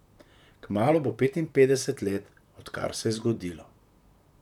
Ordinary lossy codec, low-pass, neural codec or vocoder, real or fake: none; 19.8 kHz; vocoder, 44.1 kHz, 128 mel bands, Pupu-Vocoder; fake